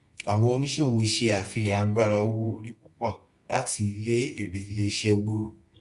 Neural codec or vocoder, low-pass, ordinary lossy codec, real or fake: codec, 24 kHz, 0.9 kbps, WavTokenizer, medium music audio release; 10.8 kHz; Opus, 64 kbps; fake